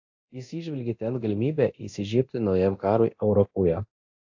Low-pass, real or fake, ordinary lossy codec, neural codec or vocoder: 7.2 kHz; fake; AAC, 48 kbps; codec, 24 kHz, 0.9 kbps, DualCodec